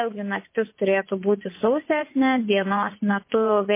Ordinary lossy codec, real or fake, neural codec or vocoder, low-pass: MP3, 24 kbps; fake; vocoder, 24 kHz, 100 mel bands, Vocos; 3.6 kHz